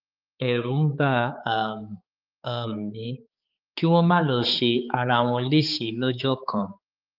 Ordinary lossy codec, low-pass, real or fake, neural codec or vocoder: Opus, 32 kbps; 5.4 kHz; fake; codec, 16 kHz, 4 kbps, X-Codec, HuBERT features, trained on balanced general audio